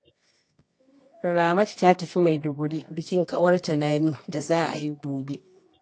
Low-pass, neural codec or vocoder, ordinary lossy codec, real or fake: 9.9 kHz; codec, 24 kHz, 0.9 kbps, WavTokenizer, medium music audio release; AAC, 48 kbps; fake